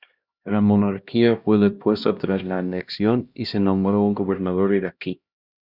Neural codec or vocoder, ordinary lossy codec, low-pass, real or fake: codec, 16 kHz, 1 kbps, X-Codec, HuBERT features, trained on LibriSpeech; Opus, 64 kbps; 5.4 kHz; fake